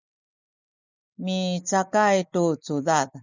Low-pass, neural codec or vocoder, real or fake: 7.2 kHz; none; real